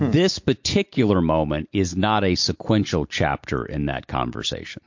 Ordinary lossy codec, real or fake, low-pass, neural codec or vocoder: MP3, 48 kbps; real; 7.2 kHz; none